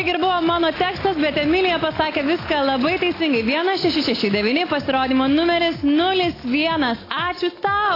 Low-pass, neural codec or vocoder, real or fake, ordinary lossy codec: 5.4 kHz; none; real; AAC, 24 kbps